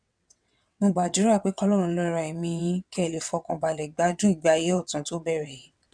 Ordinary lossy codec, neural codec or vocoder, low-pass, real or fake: none; vocoder, 22.05 kHz, 80 mel bands, WaveNeXt; 9.9 kHz; fake